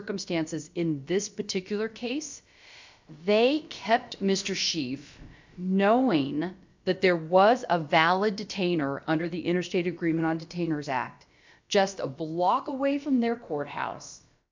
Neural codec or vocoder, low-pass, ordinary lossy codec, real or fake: codec, 16 kHz, about 1 kbps, DyCAST, with the encoder's durations; 7.2 kHz; MP3, 64 kbps; fake